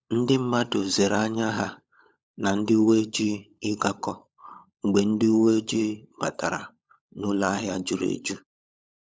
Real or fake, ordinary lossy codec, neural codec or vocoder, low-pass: fake; none; codec, 16 kHz, 16 kbps, FunCodec, trained on LibriTTS, 50 frames a second; none